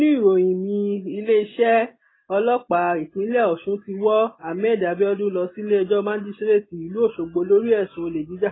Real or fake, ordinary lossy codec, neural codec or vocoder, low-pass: real; AAC, 16 kbps; none; 7.2 kHz